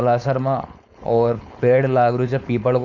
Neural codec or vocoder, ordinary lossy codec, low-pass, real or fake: codec, 16 kHz, 4.8 kbps, FACodec; none; 7.2 kHz; fake